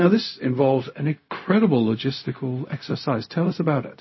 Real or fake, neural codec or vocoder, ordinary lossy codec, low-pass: fake; codec, 16 kHz, 0.4 kbps, LongCat-Audio-Codec; MP3, 24 kbps; 7.2 kHz